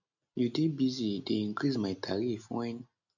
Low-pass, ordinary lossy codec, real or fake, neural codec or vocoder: 7.2 kHz; none; real; none